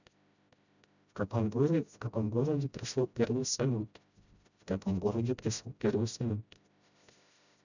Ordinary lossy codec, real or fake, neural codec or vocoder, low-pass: none; fake; codec, 16 kHz, 0.5 kbps, FreqCodec, smaller model; 7.2 kHz